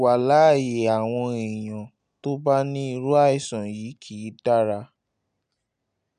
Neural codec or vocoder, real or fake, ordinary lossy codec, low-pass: none; real; none; 9.9 kHz